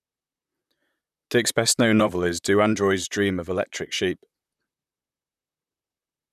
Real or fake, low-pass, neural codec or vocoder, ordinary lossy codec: fake; 14.4 kHz; vocoder, 44.1 kHz, 128 mel bands, Pupu-Vocoder; none